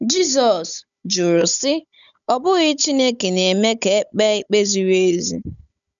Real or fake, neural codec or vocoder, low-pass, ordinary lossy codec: fake; codec, 16 kHz, 16 kbps, FunCodec, trained on Chinese and English, 50 frames a second; 7.2 kHz; none